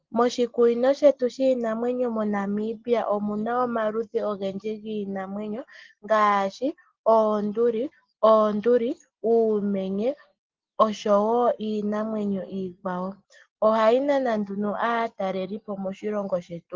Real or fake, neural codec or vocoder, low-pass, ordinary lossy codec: real; none; 7.2 kHz; Opus, 16 kbps